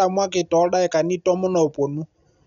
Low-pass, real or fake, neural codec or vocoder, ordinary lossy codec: 7.2 kHz; real; none; none